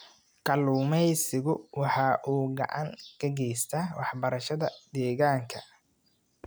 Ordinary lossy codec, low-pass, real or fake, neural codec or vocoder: none; none; real; none